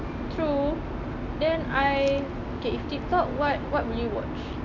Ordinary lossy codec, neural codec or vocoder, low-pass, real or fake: none; none; 7.2 kHz; real